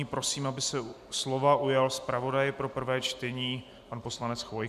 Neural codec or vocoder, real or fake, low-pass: none; real; 14.4 kHz